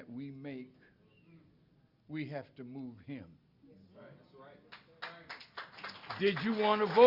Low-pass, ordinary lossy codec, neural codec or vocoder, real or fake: 5.4 kHz; Opus, 64 kbps; none; real